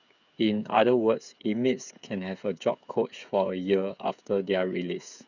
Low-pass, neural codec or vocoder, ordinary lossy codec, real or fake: 7.2 kHz; codec, 16 kHz, 8 kbps, FreqCodec, smaller model; none; fake